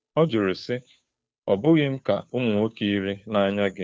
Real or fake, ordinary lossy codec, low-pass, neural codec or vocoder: fake; none; none; codec, 16 kHz, 2 kbps, FunCodec, trained on Chinese and English, 25 frames a second